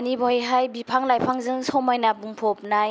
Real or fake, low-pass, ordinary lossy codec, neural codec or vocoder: real; none; none; none